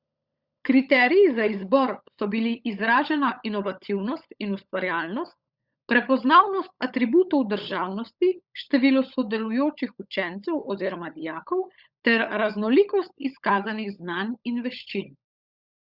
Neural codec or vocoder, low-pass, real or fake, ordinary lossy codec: codec, 16 kHz, 16 kbps, FunCodec, trained on LibriTTS, 50 frames a second; 5.4 kHz; fake; Opus, 64 kbps